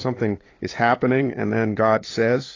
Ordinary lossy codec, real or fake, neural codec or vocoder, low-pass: AAC, 32 kbps; fake; codec, 16 kHz, 8 kbps, FunCodec, trained on LibriTTS, 25 frames a second; 7.2 kHz